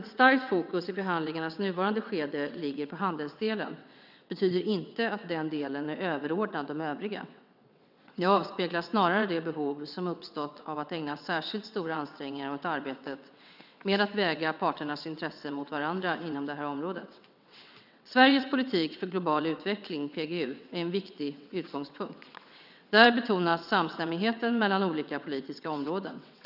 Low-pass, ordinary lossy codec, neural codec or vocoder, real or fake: 5.4 kHz; none; vocoder, 22.05 kHz, 80 mel bands, WaveNeXt; fake